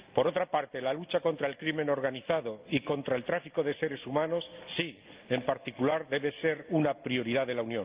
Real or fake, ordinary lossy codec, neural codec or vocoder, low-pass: real; Opus, 32 kbps; none; 3.6 kHz